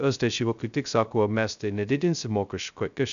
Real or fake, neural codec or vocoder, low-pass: fake; codec, 16 kHz, 0.2 kbps, FocalCodec; 7.2 kHz